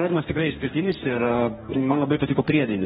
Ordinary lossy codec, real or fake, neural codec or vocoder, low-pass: AAC, 16 kbps; fake; codec, 44.1 kHz, 2.6 kbps, DAC; 19.8 kHz